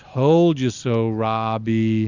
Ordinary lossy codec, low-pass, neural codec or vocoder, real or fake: Opus, 64 kbps; 7.2 kHz; none; real